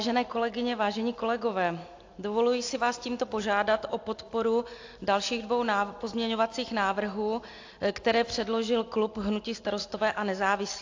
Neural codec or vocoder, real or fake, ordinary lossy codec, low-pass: none; real; AAC, 48 kbps; 7.2 kHz